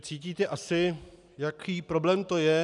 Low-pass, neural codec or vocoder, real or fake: 10.8 kHz; none; real